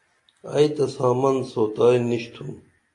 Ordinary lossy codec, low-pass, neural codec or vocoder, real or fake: AAC, 48 kbps; 10.8 kHz; none; real